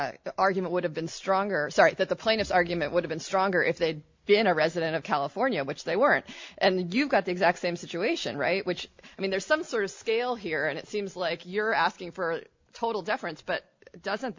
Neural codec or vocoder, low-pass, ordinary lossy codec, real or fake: none; 7.2 kHz; MP3, 48 kbps; real